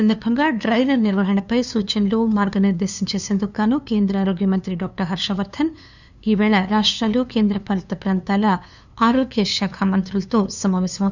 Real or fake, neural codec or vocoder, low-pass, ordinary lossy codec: fake; codec, 16 kHz, 2 kbps, FunCodec, trained on LibriTTS, 25 frames a second; 7.2 kHz; none